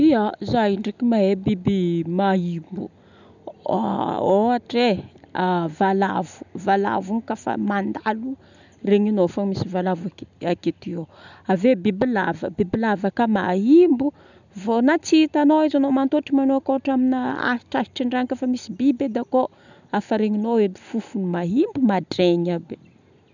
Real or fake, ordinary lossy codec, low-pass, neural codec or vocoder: real; none; 7.2 kHz; none